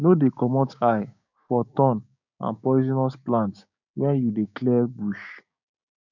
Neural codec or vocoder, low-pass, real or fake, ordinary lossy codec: codec, 16 kHz, 6 kbps, DAC; 7.2 kHz; fake; none